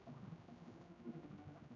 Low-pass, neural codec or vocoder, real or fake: 7.2 kHz; codec, 16 kHz, 0.5 kbps, X-Codec, HuBERT features, trained on general audio; fake